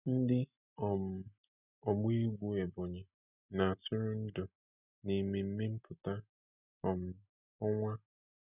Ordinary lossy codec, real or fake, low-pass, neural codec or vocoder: none; real; 3.6 kHz; none